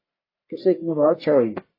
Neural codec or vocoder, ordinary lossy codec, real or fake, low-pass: codec, 44.1 kHz, 1.7 kbps, Pupu-Codec; MP3, 24 kbps; fake; 5.4 kHz